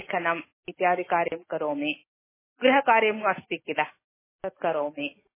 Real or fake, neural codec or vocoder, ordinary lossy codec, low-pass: real; none; MP3, 16 kbps; 3.6 kHz